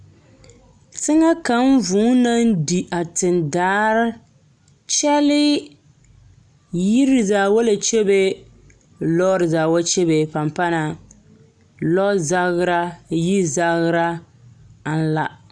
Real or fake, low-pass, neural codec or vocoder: real; 9.9 kHz; none